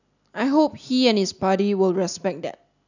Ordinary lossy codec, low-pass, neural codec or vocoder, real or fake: none; 7.2 kHz; none; real